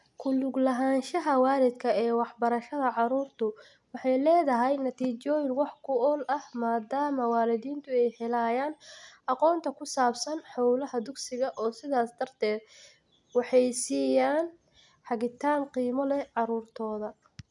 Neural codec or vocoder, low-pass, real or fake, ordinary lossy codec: none; 10.8 kHz; real; none